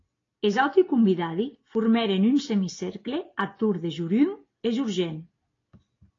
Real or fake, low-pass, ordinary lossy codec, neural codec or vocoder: real; 7.2 kHz; AAC, 32 kbps; none